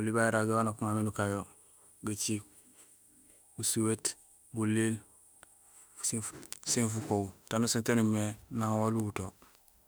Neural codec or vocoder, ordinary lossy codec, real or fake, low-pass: autoencoder, 48 kHz, 32 numbers a frame, DAC-VAE, trained on Japanese speech; none; fake; none